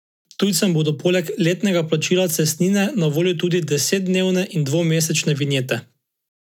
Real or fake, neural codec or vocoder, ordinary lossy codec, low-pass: real; none; none; 19.8 kHz